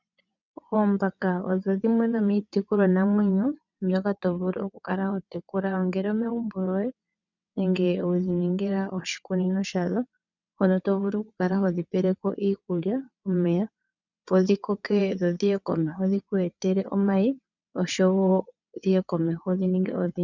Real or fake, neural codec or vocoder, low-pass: fake; vocoder, 22.05 kHz, 80 mel bands, WaveNeXt; 7.2 kHz